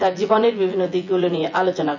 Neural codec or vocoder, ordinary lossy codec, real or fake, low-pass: vocoder, 24 kHz, 100 mel bands, Vocos; none; fake; 7.2 kHz